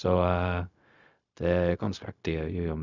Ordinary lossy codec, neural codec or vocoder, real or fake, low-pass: none; codec, 16 kHz, 0.4 kbps, LongCat-Audio-Codec; fake; 7.2 kHz